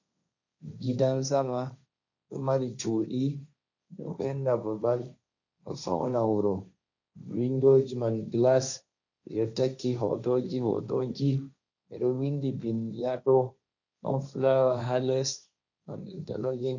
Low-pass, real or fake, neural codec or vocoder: 7.2 kHz; fake; codec, 16 kHz, 1.1 kbps, Voila-Tokenizer